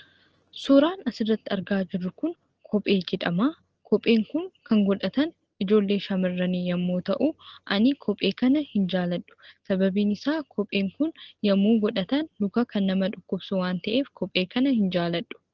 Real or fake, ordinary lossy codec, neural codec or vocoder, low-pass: real; Opus, 16 kbps; none; 7.2 kHz